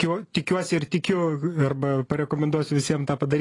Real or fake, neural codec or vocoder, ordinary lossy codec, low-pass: real; none; AAC, 32 kbps; 10.8 kHz